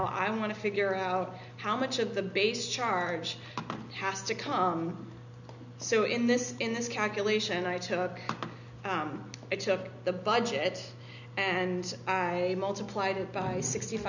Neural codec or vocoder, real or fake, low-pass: none; real; 7.2 kHz